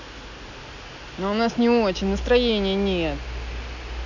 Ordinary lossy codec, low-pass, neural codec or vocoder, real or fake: none; 7.2 kHz; none; real